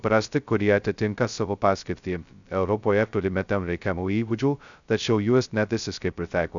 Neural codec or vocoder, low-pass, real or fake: codec, 16 kHz, 0.2 kbps, FocalCodec; 7.2 kHz; fake